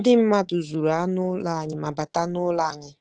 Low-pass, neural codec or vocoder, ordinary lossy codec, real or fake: 9.9 kHz; codec, 24 kHz, 3.1 kbps, DualCodec; Opus, 16 kbps; fake